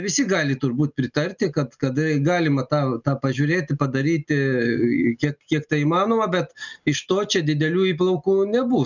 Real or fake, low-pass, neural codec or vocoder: real; 7.2 kHz; none